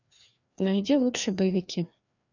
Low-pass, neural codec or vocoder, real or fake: 7.2 kHz; codec, 16 kHz, 2 kbps, FreqCodec, larger model; fake